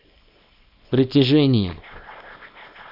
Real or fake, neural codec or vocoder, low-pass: fake; codec, 24 kHz, 0.9 kbps, WavTokenizer, small release; 5.4 kHz